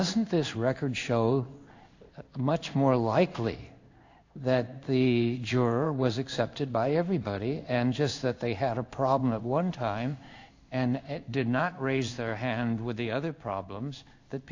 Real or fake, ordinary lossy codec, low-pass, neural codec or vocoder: fake; AAC, 48 kbps; 7.2 kHz; codec, 16 kHz in and 24 kHz out, 1 kbps, XY-Tokenizer